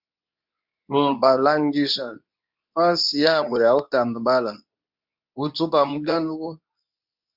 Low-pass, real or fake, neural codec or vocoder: 5.4 kHz; fake; codec, 24 kHz, 0.9 kbps, WavTokenizer, medium speech release version 2